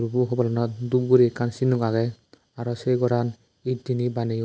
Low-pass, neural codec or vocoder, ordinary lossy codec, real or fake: none; none; none; real